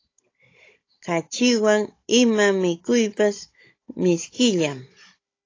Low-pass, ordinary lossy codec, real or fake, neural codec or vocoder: 7.2 kHz; AAC, 32 kbps; fake; codec, 16 kHz, 16 kbps, FunCodec, trained on Chinese and English, 50 frames a second